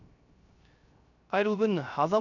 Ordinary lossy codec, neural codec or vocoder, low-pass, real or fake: none; codec, 16 kHz, 0.3 kbps, FocalCodec; 7.2 kHz; fake